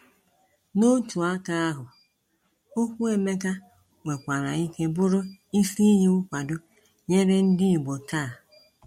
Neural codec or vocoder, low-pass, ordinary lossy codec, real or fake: none; 19.8 kHz; MP3, 64 kbps; real